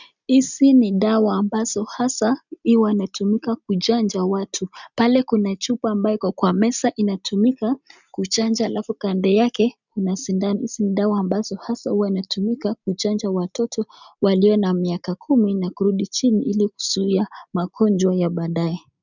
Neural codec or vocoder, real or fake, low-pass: none; real; 7.2 kHz